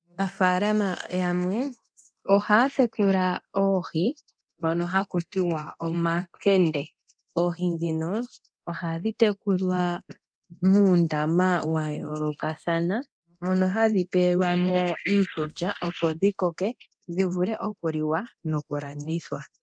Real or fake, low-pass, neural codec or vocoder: fake; 9.9 kHz; codec, 24 kHz, 0.9 kbps, DualCodec